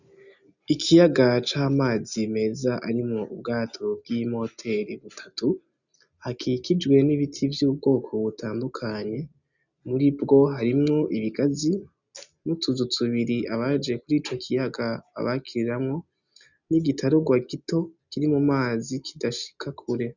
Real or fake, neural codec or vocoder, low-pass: real; none; 7.2 kHz